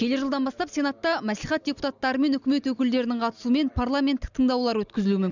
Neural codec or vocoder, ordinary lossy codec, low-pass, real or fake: none; none; 7.2 kHz; real